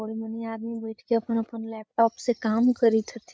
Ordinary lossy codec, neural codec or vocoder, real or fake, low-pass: none; codec, 16 kHz, 8 kbps, FreqCodec, larger model; fake; none